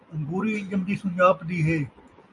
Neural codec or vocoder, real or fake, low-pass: none; real; 10.8 kHz